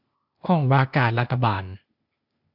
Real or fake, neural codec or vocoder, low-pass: fake; codec, 16 kHz, 0.8 kbps, ZipCodec; 5.4 kHz